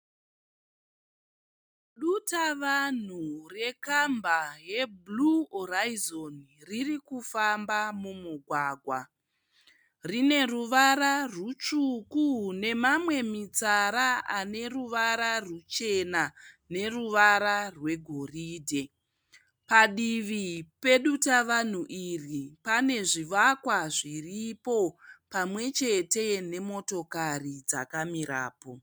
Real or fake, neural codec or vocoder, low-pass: real; none; 19.8 kHz